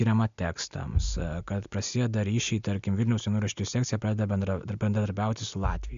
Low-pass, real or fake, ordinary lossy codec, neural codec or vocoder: 7.2 kHz; real; MP3, 64 kbps; none